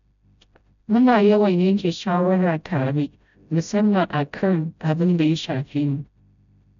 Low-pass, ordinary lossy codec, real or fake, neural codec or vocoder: 7.2 kHz; none; fake; codec, 16 kHz, 0.5 kbps, FreqCodec, smaller model